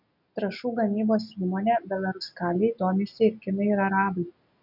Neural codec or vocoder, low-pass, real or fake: none; 5.4 kHz; real